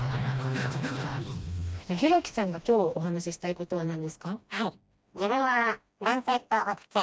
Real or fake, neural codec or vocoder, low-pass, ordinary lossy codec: fake; codec, 16 kHz, 1 kbps, FreqCodec, smaller model; none; none